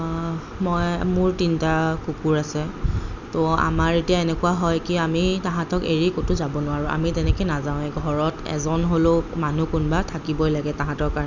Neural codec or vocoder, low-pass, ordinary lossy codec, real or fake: none; 7.2 kHz; none; real